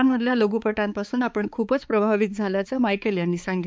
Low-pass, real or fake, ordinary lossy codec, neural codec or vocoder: none; fake; none; codec, 16 kHz, 4 kbps, X-Codec, HuBERT features, trained on balanced general audio